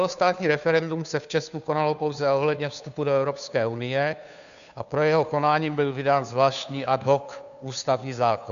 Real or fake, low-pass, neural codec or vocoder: fake; 7.2 kHz; codec, 16 kHz, 2 kbps, FunCodec, trained on Chinese and English, 25 frames a second